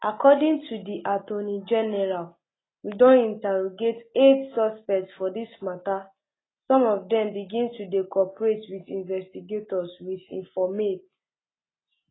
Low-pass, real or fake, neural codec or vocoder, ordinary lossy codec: 7.2 kHz; real; none; AAC, 16 kbps